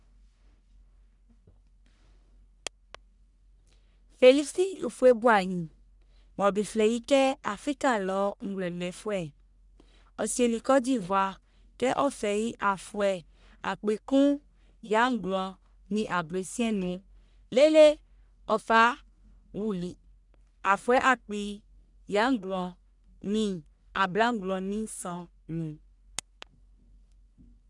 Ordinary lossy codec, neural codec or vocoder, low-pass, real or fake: none; codec, 44.1 kHz, 1.7 kbps, Pupu-Codec; 10.8 kHz; fake